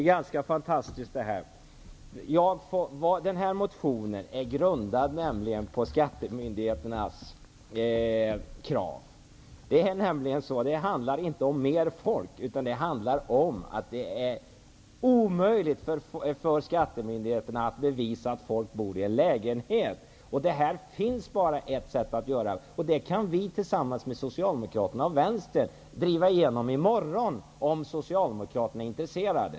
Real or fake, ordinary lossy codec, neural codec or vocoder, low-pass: real; none; none; none